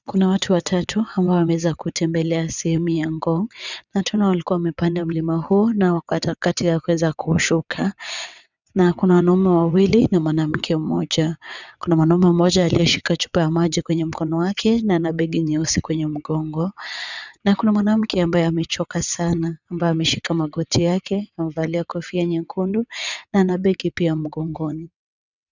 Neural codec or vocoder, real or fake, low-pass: vocoder, 22.05 kHz, 80 mel bands, WaveNeXt; fake; 7.2 kHz